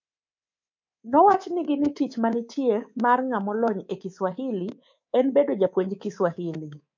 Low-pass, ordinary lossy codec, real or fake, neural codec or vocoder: 7.2 kHz; MP3, 48 kbps; fake; codec, 24 kHz, 3.1 kbps, DualCodec